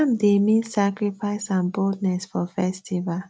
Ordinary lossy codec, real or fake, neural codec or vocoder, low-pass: none; real; none; none